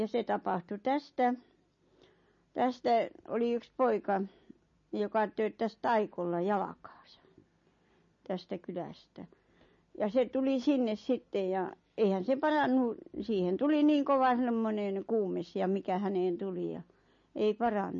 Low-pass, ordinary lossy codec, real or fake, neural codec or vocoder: 7.2 kHz; MP3, 32 kbps; real; none